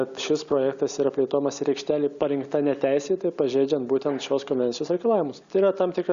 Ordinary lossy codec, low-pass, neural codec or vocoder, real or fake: Opus, 64 kbps; 7.2 kHz; none; real